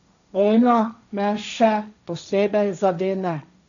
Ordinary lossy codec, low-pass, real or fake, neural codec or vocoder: none; 7.2 kHz; fake; codec, 16 kHz, 1.1 kbps, Voila-Tokenizer